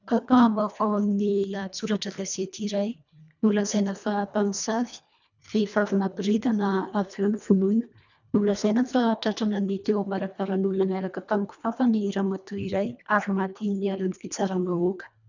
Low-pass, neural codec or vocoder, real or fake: 7.2 kHz; codec, 24 kHz, 1.5 kbps, HILCodec; fake